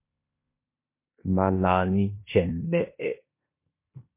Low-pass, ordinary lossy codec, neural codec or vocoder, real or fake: 3.6 kHz; MP3, 24 kbps; codec, 16 kHz in and 24 kHz out, 0.9 kbps, LongCat-Audio-Codec, four codebook decoder; fake